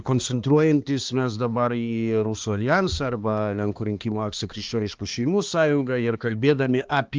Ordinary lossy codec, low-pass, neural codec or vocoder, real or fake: Opus, 24 kbps; 7.2 kHz; codec, 16 kHz, 2 kbps, X-Codec, HuBERT features, trained on balanced general audio; fake